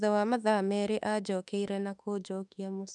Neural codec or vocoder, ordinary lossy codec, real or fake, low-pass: autoencoder, 48 kHz, 32 numbers a frame, DAC-VAE, trained on Japanese speech; none; fake; 10.8 kHz